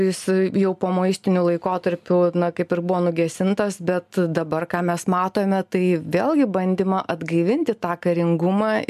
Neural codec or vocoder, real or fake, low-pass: none; real; 14.4 kHz